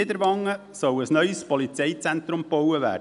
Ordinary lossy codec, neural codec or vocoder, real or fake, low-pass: none; none; real; 10.8 kHz